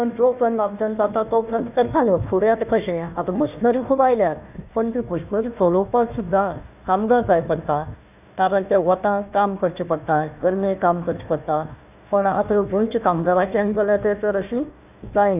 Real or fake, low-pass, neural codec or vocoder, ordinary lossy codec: fake; 3.6 kHz; codec, 16 kHz, 1 kbps, FunCodec, trained on Chinese and English, 50 frames a second; none